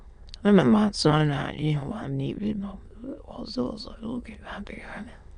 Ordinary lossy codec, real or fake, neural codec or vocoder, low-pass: none; fake; autoencoder, 22.05 kHz, a latent of 192 numbers a frame, VITS, trained on many speakers; 9.9 kHz